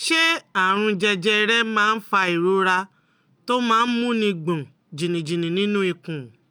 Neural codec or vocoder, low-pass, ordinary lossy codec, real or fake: none; none; none; real